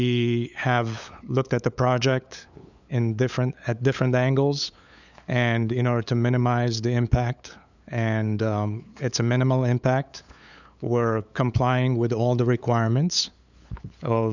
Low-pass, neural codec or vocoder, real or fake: 7.2 kHz; codec, 16 kHz, 8 kbps, FunCodec, trained on LibriTTS, 25 frames a second; fake